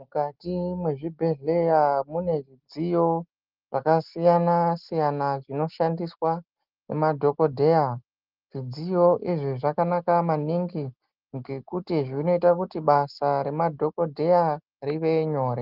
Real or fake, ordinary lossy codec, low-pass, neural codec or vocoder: real; Opus, 32 kbps; 5.4 kHz; none